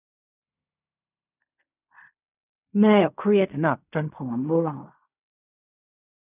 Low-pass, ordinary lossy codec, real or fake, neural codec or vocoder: 3.6 kHz; none; fake; codec, 16 kHz in and 24 kHz out, 0.4 kbps, LongCat-Audio-Codec, fine tuned four codebook decoder